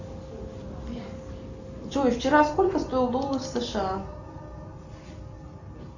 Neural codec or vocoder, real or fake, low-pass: none; real; 7.2 kHz